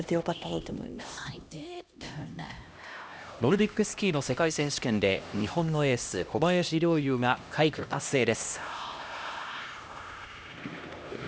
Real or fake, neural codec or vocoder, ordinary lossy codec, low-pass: fake; codec, 16 kHz, 1 kbps, X-Codec, HuBERT features, trained on LibriSpeech; none; none